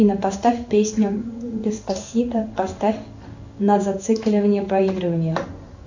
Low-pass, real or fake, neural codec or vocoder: 7.2 kHz; fake; codec, 16 kHz in and 24 kHz out, 1 kbps, XY-Tokenizer